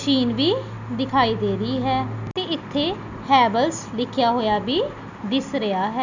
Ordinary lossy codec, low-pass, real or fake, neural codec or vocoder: none; 7.2 kHz; real; none